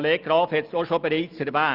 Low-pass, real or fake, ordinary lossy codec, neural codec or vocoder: 5.4 kHz; real; Opus, 24 kbps; none